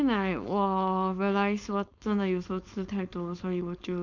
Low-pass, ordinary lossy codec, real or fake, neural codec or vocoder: 7.2 kHz; none; fake; codec, 16 kHz, 4.8 kbps, FACodec